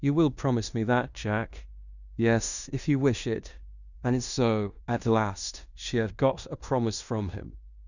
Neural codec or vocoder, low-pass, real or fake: codec, 16 kHz in and 24 kHz out, 0.9 kbps, LongCat-Audio-Codec, fine tuned four codebook decoder; 7.2 kHz; fake